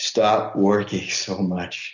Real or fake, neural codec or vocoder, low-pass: real; none; 7.2 kHz